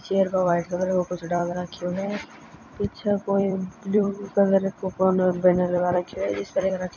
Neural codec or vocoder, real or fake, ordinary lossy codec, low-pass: vocoder, 44.1 kHz, 128 mel bands every 256 samples, BigVGAN v2; fake; none; 7.2 kHz